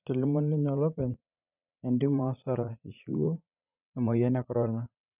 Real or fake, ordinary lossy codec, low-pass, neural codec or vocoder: fake; none; 3.6 kHz; vocoder, 44.1 kHz, 80 mel bands, Vocos